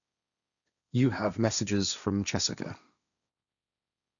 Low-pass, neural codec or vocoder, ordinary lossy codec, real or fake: 7.2 kHz; codec, 16 kHz, 1.1 kbps, Voila-Tokenizer; none; fake